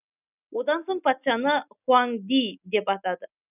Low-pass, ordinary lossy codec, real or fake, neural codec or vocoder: 3.6 kHz; none; real; none